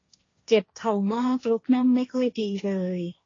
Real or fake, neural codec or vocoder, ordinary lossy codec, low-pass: fake; codec, 16 kHz, 1.1 kbps, Voila-Tokenizer; AAC, 32 kbps; 7.2 kHz